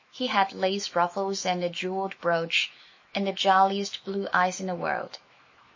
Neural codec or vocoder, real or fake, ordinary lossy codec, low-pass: autoencoder, 48 kHz, 128 numbers a frame, DAC-VAE, trained on Japanese speech; fake; MP3, 32 kbps; 7.2 kHz